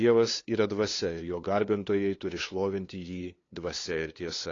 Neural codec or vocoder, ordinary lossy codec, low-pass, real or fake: codec, 16 kHz, 2 kbps, FunCodec, trained on LibriTTS, 25 frames a second; AAC, 32 kbps; 7.2 kHz; fake